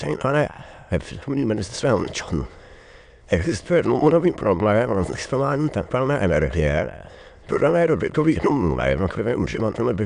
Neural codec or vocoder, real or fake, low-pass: autoencoder, 22.05 kHz, a latent of 192 numbers a frame, VITS, trained on many speakers; fake; 9.9 kHz